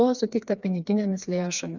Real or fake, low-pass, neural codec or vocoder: fake; 7.2 kHz; codec, 16 kHz, 4 kbps, FreqCodec, smaller model